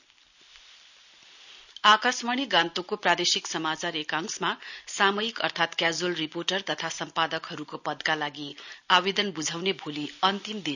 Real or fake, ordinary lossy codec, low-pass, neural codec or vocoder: real; none; 7.2 kHz; none